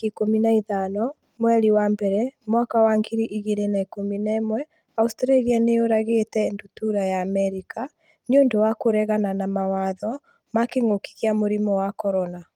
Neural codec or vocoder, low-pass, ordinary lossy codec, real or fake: vocoder, 44.1 kHz, 128 mel bands every 256 samples, BigVGAN v2; 19.8 kHz; Opus, 32 kbps; fake